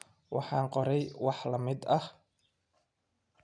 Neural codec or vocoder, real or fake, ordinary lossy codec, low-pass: none; real; none; 9.9 kHz